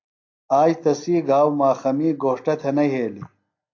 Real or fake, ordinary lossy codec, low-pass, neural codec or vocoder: real; AAC, 48 kbps; 7.2 kHz; none